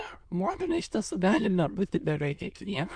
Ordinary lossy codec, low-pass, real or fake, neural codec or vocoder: MP3, 64 kbps; 9.9 kHz; fake; autoencoder, 22.05 kHz, a latent of 192 numbers a frame, VITS, trained on many speakers